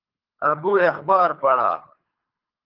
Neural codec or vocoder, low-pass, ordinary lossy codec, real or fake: codec, 24 kHz, 3 kbps, HILCodec; 5.4 kHz; Opus, 32 kbps; fake